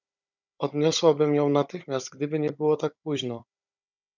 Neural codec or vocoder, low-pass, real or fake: codec, 16 kHz, 16 kbps, FunCodec, trained on Chinese and English, 50 frames a second; 7.2 kHz; fake